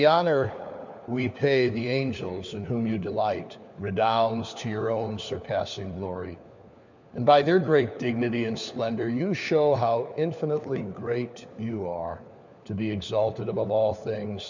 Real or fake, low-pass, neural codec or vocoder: fake; 7.2 kHz; codec, 16 kHz, 4 kbps, FunCodec, trained on LibriTTS, 50 frames a second